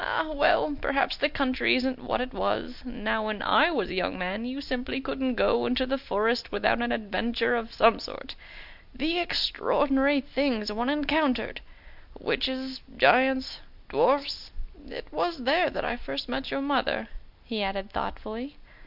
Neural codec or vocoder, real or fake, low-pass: none; real; 5.4 kHz